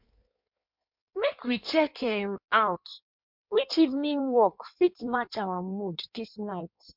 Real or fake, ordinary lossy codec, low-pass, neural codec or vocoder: fake; MP3, 48 kbps; 5.4 kHz; codec, 16 kHz in and 24 kHz out, 1.1 kbps, FireRedTTS-2 codec